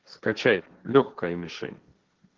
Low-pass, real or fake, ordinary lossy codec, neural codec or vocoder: 7.2 kHz; fake; Opus, 16 kbps; codec, 16 kHz, 1.1 kbps, Voila-Tokenizer